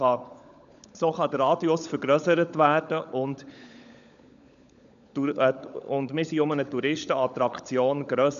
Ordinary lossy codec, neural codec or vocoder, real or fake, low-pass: none; codec, 16 kHz, 16 kbps, FunCodec, trained on LibriTTS, 50 frames a second; fake; 7.2 kHz